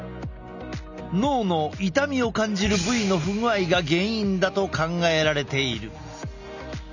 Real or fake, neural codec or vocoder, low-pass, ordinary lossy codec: real; none; 7.2 kHz; none